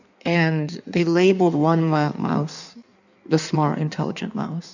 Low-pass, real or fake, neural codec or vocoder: 7.2 kHz; fake; codec, 16 kHz in and 24 kHz out, 1.1 kbps, FireRedTTS-2 codec